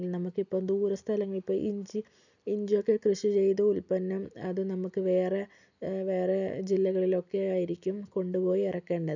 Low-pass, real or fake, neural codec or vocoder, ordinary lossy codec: 7.2 kHz; real; none; none